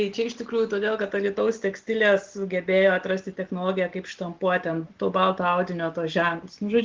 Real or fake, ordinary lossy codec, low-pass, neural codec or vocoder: real; Opus, 16 kbps; 7.2 kHz; none